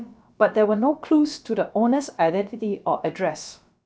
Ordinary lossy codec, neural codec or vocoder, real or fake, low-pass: none; codec, 16 kHz, about 1 kbps, DyCAST, with the encoder's durations; fake; none